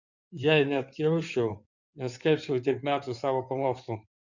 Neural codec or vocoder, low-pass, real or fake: codec, 16 kHz in and 24 kHz out, 2.2 kbps, FireRedTTS-2 codec; 7.2 kHz; fake